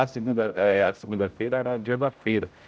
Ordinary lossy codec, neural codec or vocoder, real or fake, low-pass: none; codec, 16 kHz, 0.5 kbps, X-Codec, HuBERT features, trained on general audio; fake; none